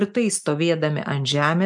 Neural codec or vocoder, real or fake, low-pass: none; real; 10.8 kHz